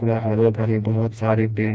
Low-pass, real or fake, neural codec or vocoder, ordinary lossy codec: none; fake; codec, 16 kHz, 1 kbps, FreqCodec, smaller model; none